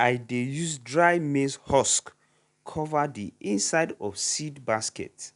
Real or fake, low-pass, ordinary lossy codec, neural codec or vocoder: real; 10.8 kHz; none; none